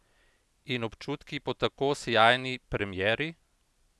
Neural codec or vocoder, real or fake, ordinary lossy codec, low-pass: none; real; none; none